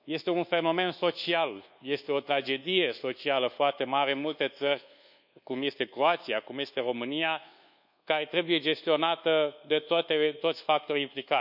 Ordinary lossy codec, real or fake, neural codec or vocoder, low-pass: none; fake; codec, 24 kHz, 1.2 kbps, DualCodec; 5.4 kHz